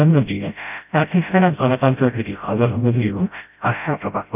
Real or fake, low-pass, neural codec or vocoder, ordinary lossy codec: fake; 3.6 kHz; codec, 16 kHz, 0.5 kbps, FreqCodec, smaller model; none